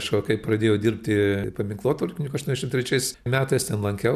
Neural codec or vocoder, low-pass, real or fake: none; 14.4 kHz; real